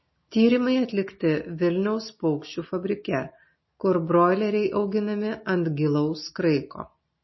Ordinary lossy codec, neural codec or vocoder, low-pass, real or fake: MP3, 24 kbps; none; 7.2 kHz; real